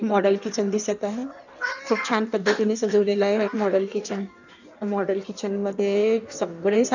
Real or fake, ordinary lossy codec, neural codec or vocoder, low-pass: fake; none; codec, 16 kHz in and 24 kHz out, 1.1 kbps, FireRedTTS-2 codec; 7.2 kHz